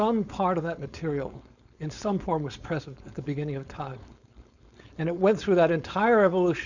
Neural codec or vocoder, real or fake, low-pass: codec, 16 kHz, 4.8 kbps, FACodec; fake; 7.2 kHz